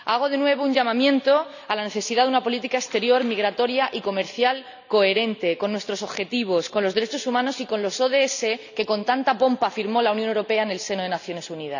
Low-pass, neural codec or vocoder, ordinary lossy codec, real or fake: 7.2 kHz; none; none; real